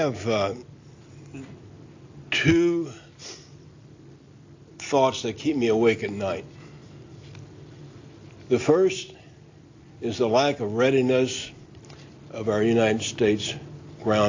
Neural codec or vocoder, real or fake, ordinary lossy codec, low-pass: none; real; AAC, 48 kbps; 7.2 kHz